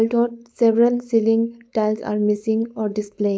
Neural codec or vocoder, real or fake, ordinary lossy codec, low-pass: codec, 16 kHz, 4.8 kbps, FACodec; fake; none; none